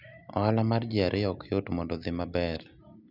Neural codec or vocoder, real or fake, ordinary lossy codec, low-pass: none; real; none; 5.4 kHz